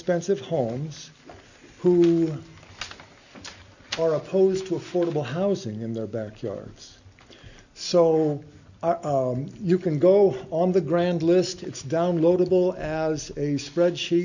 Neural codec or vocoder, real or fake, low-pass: none; real; 7.2 kHz